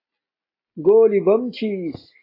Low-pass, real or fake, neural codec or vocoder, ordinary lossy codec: 5.4 kHz; real; none; AAC, 24 kbps